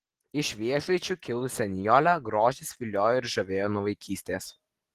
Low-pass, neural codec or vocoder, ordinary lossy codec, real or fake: 14.4 kHz; none; Opus, 16 kbps; real